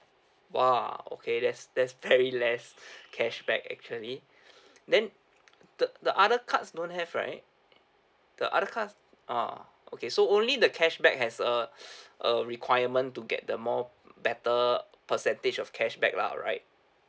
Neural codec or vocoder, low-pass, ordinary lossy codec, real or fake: none; none; none; real